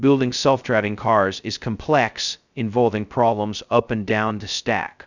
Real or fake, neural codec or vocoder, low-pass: fake; codec, 16 kHz, 0.2 kbps, FocalCodec; 7.2 kHz